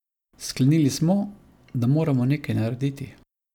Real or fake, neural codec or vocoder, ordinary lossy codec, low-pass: real; none; none; 19.8 kHz